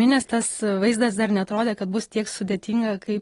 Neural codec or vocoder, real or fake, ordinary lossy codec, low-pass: none; real; AAC, 32 kbps; 10.8 kHz